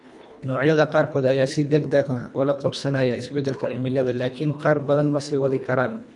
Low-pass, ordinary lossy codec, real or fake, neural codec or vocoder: 10.8 kHz; none; fake; codec, 24 kHz, 1.5 kbps, HILCodec